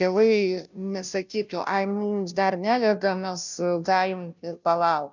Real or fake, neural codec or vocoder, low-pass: fake; codec, 16 kHz, 0.5 kbps, FunCodec, trained on Chinese and English, 25 frames a second; 7.2 kHz